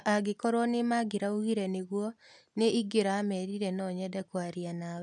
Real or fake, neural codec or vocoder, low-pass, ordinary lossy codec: real; none; 10.8 kHz; none